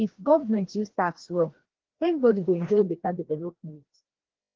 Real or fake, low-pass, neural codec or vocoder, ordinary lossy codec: fake; 7.2 kHz; codec, 16 kHz, 1 kbps, FreqCodec, larger model; Opus, 16 kbps